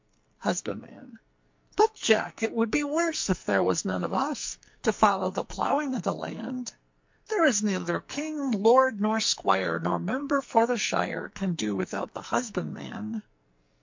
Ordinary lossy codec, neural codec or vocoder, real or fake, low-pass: MP3, 48 kbps; codec, 44.1 kHz, 2.6 kbps, SNAC; fake; 7.2 kHz